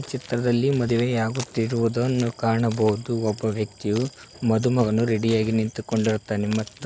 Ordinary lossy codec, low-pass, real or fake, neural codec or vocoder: none; none; real; none